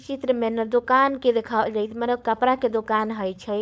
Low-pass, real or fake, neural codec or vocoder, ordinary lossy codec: none; fake; codec, 16 kHz, 4.8 kbps, FACodec; none